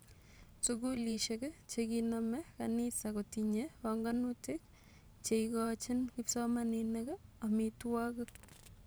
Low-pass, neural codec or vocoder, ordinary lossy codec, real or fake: none; vocoder, 44.1 kHz, 128 mel bands every 512 samples, BigVGAN v2; none; fake